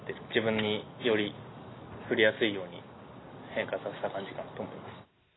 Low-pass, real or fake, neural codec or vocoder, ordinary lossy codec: 7.2 kHz; real; none; AAC, 16 kbps